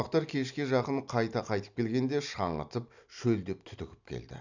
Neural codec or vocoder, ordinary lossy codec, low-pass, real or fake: none; none; 7.2 kHz; real